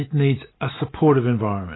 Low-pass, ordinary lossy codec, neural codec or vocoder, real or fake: 7.2 kHz; AAC, 16 kbps; none; real